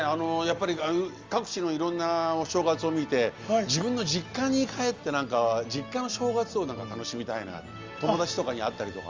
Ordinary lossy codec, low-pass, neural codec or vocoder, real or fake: Opus, 32 kbps; 7.2 kHz; none; real